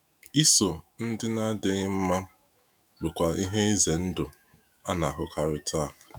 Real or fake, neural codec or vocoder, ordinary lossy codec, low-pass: fake; autoencoder, 48 kHz, 128 numbers a frame, DAC-VAE, trained on Japanese speech; none; none